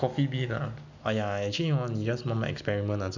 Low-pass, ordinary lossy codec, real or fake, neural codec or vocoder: 7.2 kHz; none; real; none